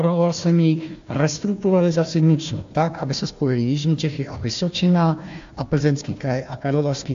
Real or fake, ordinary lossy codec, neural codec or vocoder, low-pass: fake; AAC, 64 kbps; codec, 16 kHz, 1 kbps, FunCodec, trained on Chinese and English, 50 frames a second; 7.2 kHz